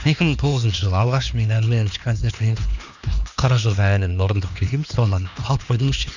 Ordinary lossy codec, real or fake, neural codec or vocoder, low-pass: none; fake; codec, 16 kHz, 2 kbps, X-Codec, HuBERT features, trained on LibriSpeech; 7.2 kHz